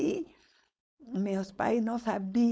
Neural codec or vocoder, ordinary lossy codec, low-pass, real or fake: codec, 16 kHz, 4.8 kbps, FACodec; none; none; fake